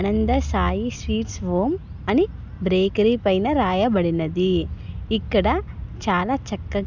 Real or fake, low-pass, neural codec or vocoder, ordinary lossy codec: real; 7.2 kHz; none; none